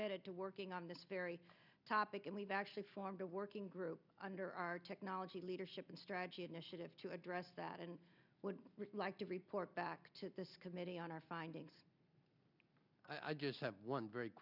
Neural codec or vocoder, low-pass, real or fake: none; 5.4 kHz; real